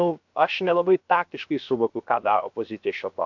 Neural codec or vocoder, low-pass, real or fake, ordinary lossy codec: codec, 16 kHz, about 1 kbps, DyCAST, with the encoder's durations; 7.2 kHz; fake; MP3, 64 kbps